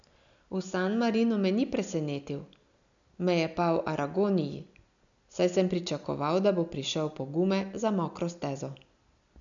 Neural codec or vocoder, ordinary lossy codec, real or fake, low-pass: none; none; real; 7.2 kHz